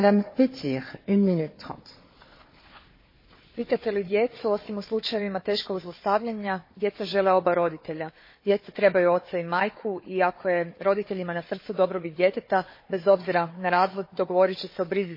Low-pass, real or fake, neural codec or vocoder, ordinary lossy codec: 5.4 kHz; fake; codec, 16 kHz, 4 kbps, FunCodec, trained on Chinese and English, 50 frames a second; MP3, 24 kbps